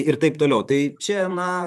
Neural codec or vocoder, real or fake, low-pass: vocoder, 44.1 kHz, 128 mel bands, Pupu-Vocoder; fake; 14.4 kHz